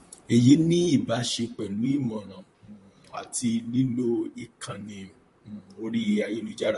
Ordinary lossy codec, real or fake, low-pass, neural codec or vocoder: MP3, 48 kbps; fake; 14.4 kHz; vocoder, 44.1 kHz, 128 mel bands, Pupu-Vocoder